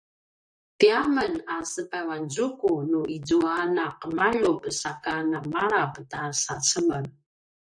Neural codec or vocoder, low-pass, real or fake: vocoder, 44.1 kHz, 128 mel bands, Pupu-Vocoder; 9.9 kHz; fake